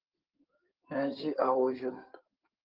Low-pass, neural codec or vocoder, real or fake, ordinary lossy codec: 5.4 kHz; codec, 16 kHz in and 24 kHz out, 2.2 kbps, FireRedTTS-2 codec; fake; Opus, 32 kbps